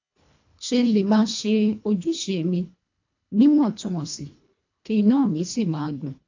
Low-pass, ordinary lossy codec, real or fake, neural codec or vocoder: 7.2 kHz; AAC, 48 kbps; fake; codec, 24 kHz, 1.5 kbps, HILCodec